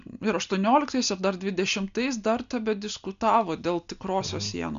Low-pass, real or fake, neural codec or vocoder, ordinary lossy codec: 7.2 kHz; real; none; MP3, 64 kbps